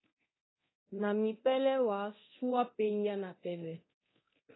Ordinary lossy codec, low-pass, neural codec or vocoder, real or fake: AAC, 16 kbps; 7.2 kHz; codec, 24 kHz, 0.9 kbps, DualCodec; fake